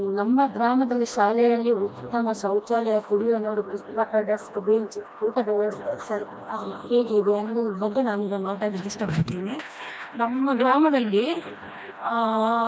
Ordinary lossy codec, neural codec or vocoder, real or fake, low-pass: none; codec, 16 kHz, 1 kbps, FreqCodec, smaller model; fake; none